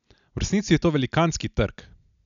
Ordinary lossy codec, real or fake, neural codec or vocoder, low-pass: none; real; none; 7.2 kHz